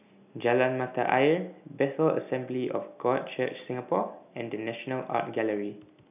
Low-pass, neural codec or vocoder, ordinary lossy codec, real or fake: 3.6 kHz; none; none; real